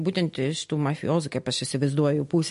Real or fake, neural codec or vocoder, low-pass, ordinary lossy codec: real; none; 10.8 kHz; MP3, 48 kbps